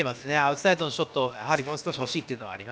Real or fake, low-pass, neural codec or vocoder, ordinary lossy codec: fake; none; codec, 16 kHz, about 1 kbps, DyCAST, with the encoder's durations; none